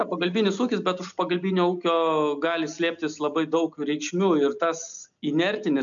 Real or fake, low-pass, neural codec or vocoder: real; 7.2 kHz; none